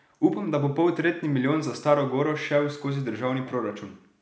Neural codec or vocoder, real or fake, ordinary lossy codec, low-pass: none; real; none; none